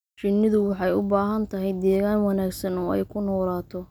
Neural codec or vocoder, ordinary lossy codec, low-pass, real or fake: none; none; none; real